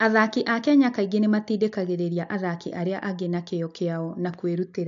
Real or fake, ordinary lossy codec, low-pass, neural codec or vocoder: real; AAC, 64 kbps; 7.2 kHz; none